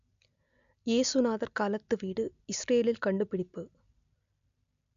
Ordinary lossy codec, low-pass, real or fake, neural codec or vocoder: MP3, 64 kbps; 7.2 kHz; real; none